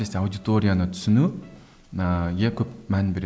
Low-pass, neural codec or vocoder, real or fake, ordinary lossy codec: none; none; real; none